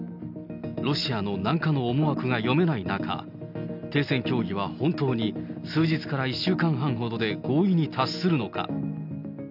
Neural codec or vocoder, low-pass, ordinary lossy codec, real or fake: none; 5.4 kHz; none; real